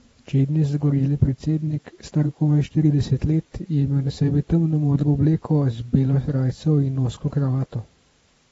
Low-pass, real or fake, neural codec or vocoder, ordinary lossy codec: 19.8 kHz; fake; vocoder, 44.1 kHz, 128 mel bands every 512 samples, BigVGAN v2; AAC, 24 kbps